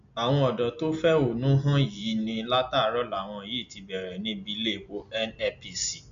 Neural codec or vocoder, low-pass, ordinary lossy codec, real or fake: none; 7.2 kHz; AAC, 96 kbps; real